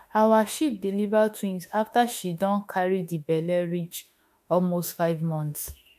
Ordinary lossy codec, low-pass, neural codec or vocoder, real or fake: MP3, 96 kbps; 14.4 kHz; autoencoder, 48 kHz, 32 numbers a frame, DAC-VAE, trained on Japanese speech; fake